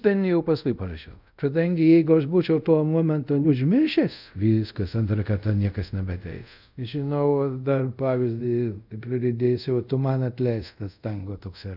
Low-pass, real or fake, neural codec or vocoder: 5.4 kHz; fake; codec, 24 kHz, 0.5 kbps, DualCodec